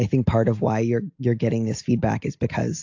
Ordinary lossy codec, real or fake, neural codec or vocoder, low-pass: AAC, 48 kbps; real; none; 7.2 kHz